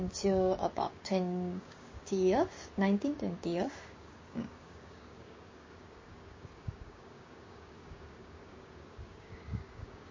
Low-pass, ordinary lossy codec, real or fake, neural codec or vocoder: 7.2 kHz; MP3, 32 kbps; fake; codec, 16 kHz, 6 kbps, DAC